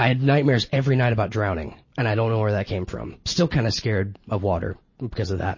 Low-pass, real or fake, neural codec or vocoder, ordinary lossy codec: 7.2 kHz; real; none; MP3, 32 kbps